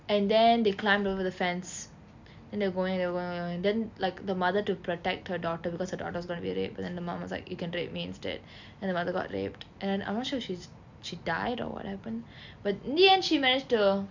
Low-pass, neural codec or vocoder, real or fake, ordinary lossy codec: 7.2 kHz; none; real; MP3, 64 kbps